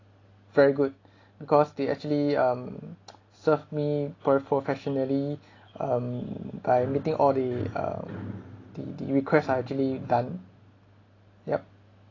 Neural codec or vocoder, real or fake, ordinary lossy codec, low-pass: none; real; AAC, 32 kbps; 7.2 kHz